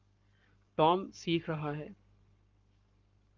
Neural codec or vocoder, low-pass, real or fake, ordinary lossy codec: codec, 44.1 kHz, 7.8 kbps, Pupu-Codec; 7.2 kHz; fake; Opus, 24 kbps